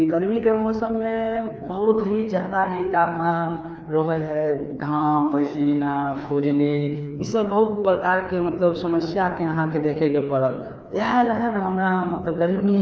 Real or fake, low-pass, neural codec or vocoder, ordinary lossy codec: fake; none; codec, 16 kHz, 2 kbps, FreqCodec, larger model; none